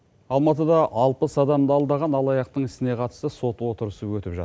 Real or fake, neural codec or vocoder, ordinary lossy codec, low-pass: real; none; none; none